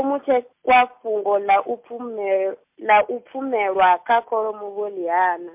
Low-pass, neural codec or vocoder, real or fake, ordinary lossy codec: 3.6 kHz; none; real; MP3, 32 kbps